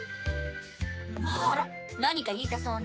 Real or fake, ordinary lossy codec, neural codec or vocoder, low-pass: fake; none; codec, 16 kHz, 4 kbps, X-Codec, HuBERT features, trained on general audio; none